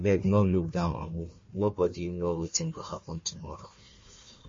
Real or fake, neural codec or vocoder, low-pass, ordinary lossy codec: fake; codec, 16 kHz, 1 kbps, FunCodec, trained on Chinese and English, 50 frames a second; 7.2 kHz; MP3, 32 kbps